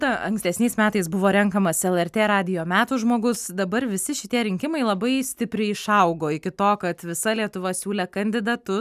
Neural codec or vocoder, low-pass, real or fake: none; 14.4 kHz; real